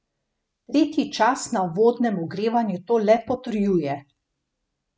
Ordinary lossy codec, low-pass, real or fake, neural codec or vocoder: none; none; real; none